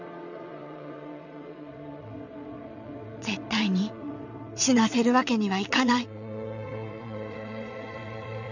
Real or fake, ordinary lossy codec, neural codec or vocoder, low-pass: fake; none; vocoder, 22.05 kHz, 80 mel bands, WaveNeXt; 7.2 kHz